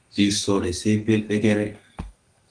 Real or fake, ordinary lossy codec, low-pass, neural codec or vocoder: fake; Opus, 24 kbps; 9.9 kHz; codec, 32 kHz, 1.9 kbps, SNAC